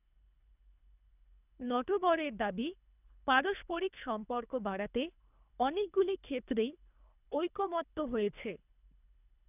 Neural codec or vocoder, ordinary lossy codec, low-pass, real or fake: codec, 24 kHz, 3 kbps, HILCodec; none; 3.6 kHz; fake